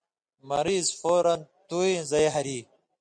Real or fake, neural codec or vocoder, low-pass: real; none; 9.9 kHz